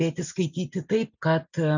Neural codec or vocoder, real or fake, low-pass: none; real; 7.2 kHz